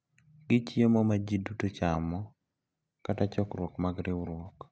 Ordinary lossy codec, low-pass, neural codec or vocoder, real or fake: none; none; none; real